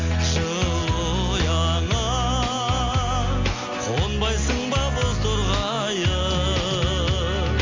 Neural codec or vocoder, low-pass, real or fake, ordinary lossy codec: none; 7.2 kHz; real; MP3, 48 kbps